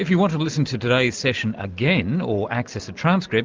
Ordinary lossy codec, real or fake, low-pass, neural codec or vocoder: Opus, 32 kbps; real; 7.2 kHz; none